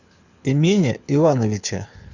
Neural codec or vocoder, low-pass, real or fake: codec, 24 kHz, 6 kbps, HILCodec; 7.2 kHz; fake